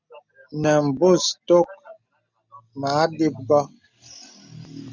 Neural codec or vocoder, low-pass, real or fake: none; 7.2 kHz; real